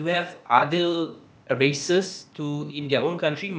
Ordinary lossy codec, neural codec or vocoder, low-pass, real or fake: none; codec, 16 kHz, 0.8 kbps, ZipCodec; none; fake